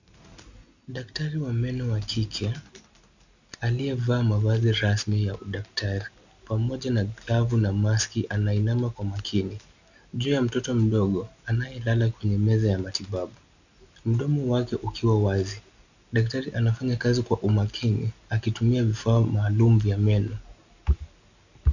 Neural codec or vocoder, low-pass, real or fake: none; 7.2 kHz; real